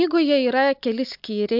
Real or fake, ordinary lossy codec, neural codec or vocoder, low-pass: real; AAC, 48 kbps; none; 5.4 kHz